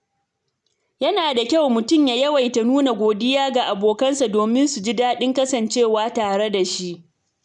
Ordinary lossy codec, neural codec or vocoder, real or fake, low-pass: none; none; real; 10.8 kHz